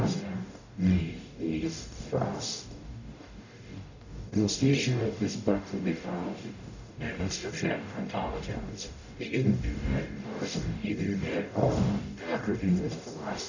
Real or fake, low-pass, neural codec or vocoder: fake; 7.2 kHz; codec, 44.1 kHz, 0.9 kbps, DAC